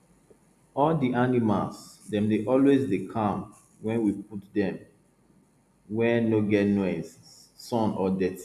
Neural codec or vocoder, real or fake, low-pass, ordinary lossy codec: none; real; 14.4 kHz; none